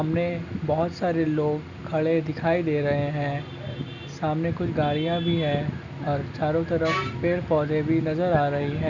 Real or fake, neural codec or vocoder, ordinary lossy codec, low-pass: real; none; none; 7.2 kHz